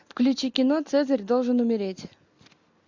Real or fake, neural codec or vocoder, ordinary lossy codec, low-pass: real; none; MP3, 64 kbps; 7.2 kHz